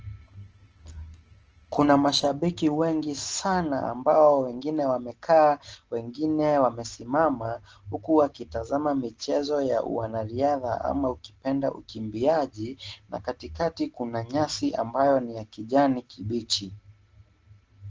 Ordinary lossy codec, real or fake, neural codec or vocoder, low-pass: Opus, 16 kbps; real; none; 7.2 kHz